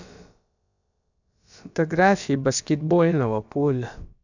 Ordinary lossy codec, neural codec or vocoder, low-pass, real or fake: none; codec, 16 kHz, about 1 kbps, DyCAST, with the encoder's durations; 7.2 kHz; fake